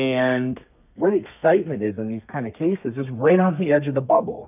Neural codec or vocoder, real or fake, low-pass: codec, 32 kHz, 1.9 kbps, SNAC; fake; 3.6 kHz